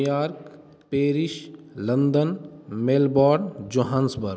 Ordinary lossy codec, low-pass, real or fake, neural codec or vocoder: none; none; real; none